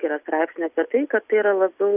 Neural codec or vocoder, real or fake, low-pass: none; real; 3.6 kHz